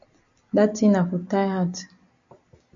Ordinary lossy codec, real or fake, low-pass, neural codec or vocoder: AAC, 64 kbps; real; 7.2 kHz; none